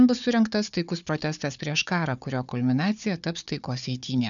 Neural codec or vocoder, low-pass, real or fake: codec, 16 kHz, 4 kbps, FunCodec, trained on Chinese and English, 50 frames a second; 7.2 kHz; fake